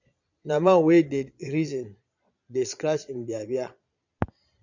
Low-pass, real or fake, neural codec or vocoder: 7.2 kHz; fake; vocoder, 22.05 kHz, 80 mel bands, Vocos